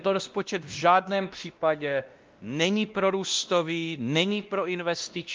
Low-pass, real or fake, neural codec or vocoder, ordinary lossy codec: 7.2 kHz; fake; codec, 16 kHz, 1 kbps, X-Codec, WavLM features, trained on Multilingual LibriSpeech; Opus, 24 kbps